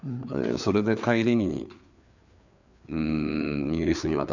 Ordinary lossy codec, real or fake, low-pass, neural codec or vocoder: none; fake; 7.2 kHz; codec, 16 kHz, 4 kbps, FreqCodec, larger model